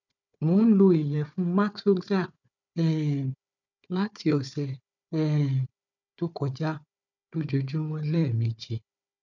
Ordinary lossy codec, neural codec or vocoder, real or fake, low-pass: none; codec, 16 kHz, 4 kbps, FunCodec, trained on Chinese and English, 50 frames a second; fake; 7.2 kHz